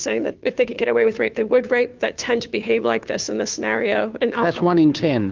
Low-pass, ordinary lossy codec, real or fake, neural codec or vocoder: 7.2 kHz; Opus, 32 kbps; fake; codec, 16 kHz, 2 kbps, FunCodec, trained on Chinese and English, 25 frames a second